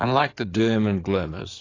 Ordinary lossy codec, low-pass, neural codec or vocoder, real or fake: AAC, 32 kbps; 7.2 kHz; codec, 16 kHz in and 24 kHz out, 2.2 kbps, FireRedTTS-2 codec; fake